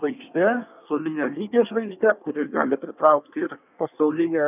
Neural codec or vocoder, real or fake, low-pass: codec, 24 kHz, 1 kbps, SNAC; fake; 3.6 kHz